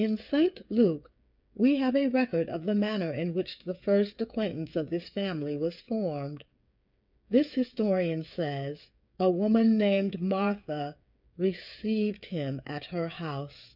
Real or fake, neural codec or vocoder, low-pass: fake; codec, 16 kHz, 8 kbps, FreqCodec, smaller model; 5.4 kHz